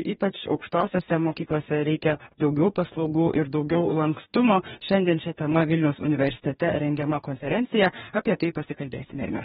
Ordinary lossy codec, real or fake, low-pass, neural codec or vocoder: AAC, 16 kbps; fake; 19.8 kHz; codec, 44.1 kHz, 2.6 kbps, DAC